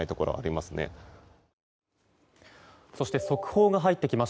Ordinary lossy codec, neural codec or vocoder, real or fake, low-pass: none; none; real; none